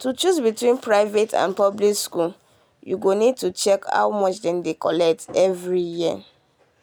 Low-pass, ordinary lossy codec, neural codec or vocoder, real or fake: none; none; none; real